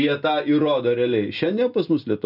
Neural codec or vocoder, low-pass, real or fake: none; 5.4 kHz; real